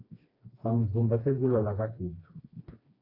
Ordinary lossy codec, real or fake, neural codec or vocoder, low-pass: AAC, 24 kbps; fake; codec, 16 kHz, 2 kbps, FreqCodec, smaller model; 5.4 kHz